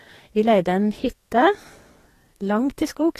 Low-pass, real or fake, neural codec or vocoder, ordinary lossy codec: 14.4 kHz; fake; codec, 44.1 kHz, 2.6 kbps, SNAC; AAC, 48 kbps